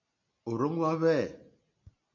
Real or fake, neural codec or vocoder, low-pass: real; none; 7.2 kHz